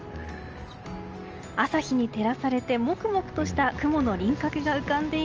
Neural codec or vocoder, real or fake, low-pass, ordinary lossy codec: none; real; 7.2 kHz; Opus, 24 kbps